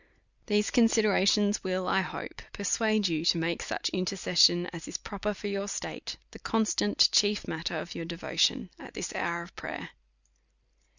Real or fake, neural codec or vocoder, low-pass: real; none; 7.2 kHz